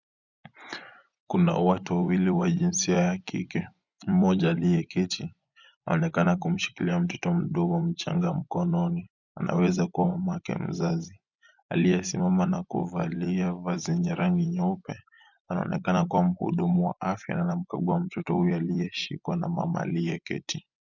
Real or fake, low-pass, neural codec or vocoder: fake; 7.2 kHz; vocoder, 24 kHz, 100 mel bands, Vocos